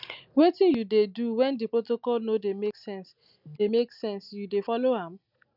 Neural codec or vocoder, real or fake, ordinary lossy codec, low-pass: none; real; none; 5.4 kHz